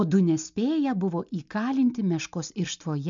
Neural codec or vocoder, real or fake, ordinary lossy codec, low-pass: none; real; MP3, 64 kbps; 7.2 kHz